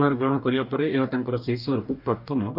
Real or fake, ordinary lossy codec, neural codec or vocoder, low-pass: fake; none; codec, 44.1 kHz, 2.6 kbps, DAC; 5.4 kHz